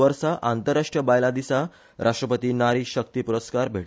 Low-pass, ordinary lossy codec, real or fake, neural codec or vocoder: none; none; real; none